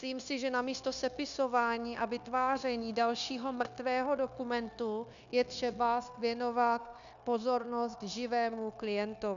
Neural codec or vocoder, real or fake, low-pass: codec, 16 kHz, 0.9 kbps, LongCat-Audio-Codec; fake; 7.2 kHz